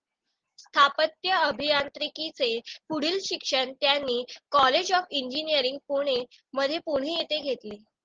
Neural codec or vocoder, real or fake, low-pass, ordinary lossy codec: none; real; 7.2 kHz; Opus, 16 kbps